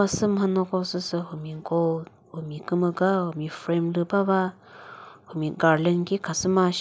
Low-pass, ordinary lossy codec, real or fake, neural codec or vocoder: none; none; real; none